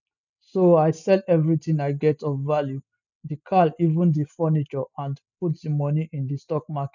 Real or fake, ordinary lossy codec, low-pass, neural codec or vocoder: real; none; 7.2 kHz; none